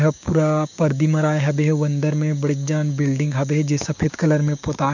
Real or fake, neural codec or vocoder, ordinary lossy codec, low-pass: fake; codec, 16 kHz, 6 kbps, DAC; none; 7.2 kHz